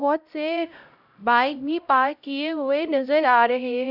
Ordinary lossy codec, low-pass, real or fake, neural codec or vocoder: none; 5.4 kHz; fake; codec, 16 kHz, 0.5 kbps, X-Codec, HuBERT features, trained on LibriSpeech